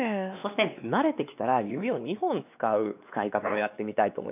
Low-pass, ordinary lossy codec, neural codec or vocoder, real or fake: 3.6 kHz; none; codec, 16 kHz, 2 kbps, X-Codec, WavLM features, trained on Multilingual LibriSpeech; fake